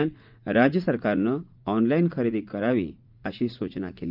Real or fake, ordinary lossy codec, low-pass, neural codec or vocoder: fake; Opus, 24 kbps; 5.4 kHz; vocoder, 44.1 kHz, 80 mel bands, Vocos